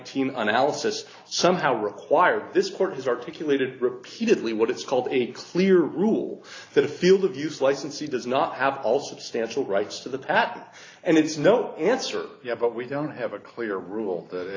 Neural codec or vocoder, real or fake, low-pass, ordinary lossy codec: none; real; 7.2 kHz; AAC, 32 kbps